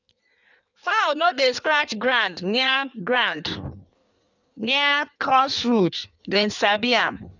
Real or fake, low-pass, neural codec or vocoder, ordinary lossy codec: fake; 7.2 kHz; codec, 16 kHz in and 24 kHz out, 1.1 kbps, FireRedTTS-2 codec; none